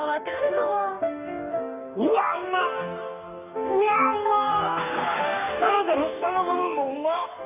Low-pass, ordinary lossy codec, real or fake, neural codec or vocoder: 3.6 kHz; none; fake; codec, 44.1 kHz, 2.6 kbps, DAC